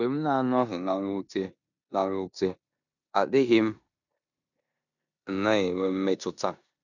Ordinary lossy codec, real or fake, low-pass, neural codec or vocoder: none; fake; 7.2 kHz; codec, 16 kHz in and 24 kHz out, 0.9 kbps, LongCat-Audio-Codec, four codebook decoder